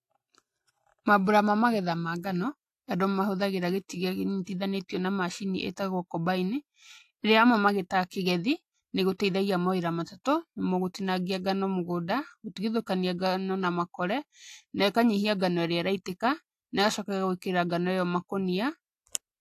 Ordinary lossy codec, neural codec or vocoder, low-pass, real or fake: AAC, 64 kbps; none; 14.4 kHz; real